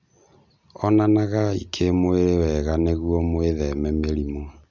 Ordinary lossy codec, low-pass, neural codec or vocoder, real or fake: none; 7.2 kHz; none; real